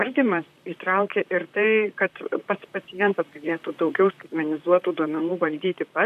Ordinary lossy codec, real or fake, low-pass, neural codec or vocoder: AAC, 96 kbps; fake; 14.4 kHz; vocoder, 44.1 kHz, 128 mel bands, Pupu-Vocoder